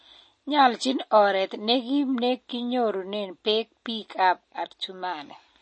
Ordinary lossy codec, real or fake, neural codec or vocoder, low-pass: MP3, 32 kbps; real; none; 9.9 kHz